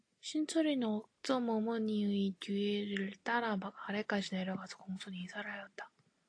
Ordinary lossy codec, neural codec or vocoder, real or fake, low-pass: AAC, 48 kbps; none; real; 9.9 kHz